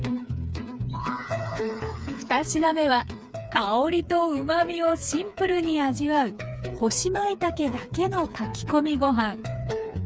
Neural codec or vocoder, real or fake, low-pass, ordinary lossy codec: codec, 16 kHz, 4 kbps, FreqCodec, smaller model; fake; none; none